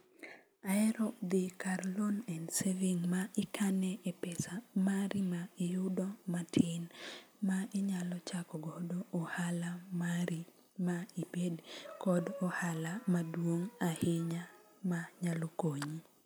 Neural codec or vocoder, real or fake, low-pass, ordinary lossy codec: none; real; none; none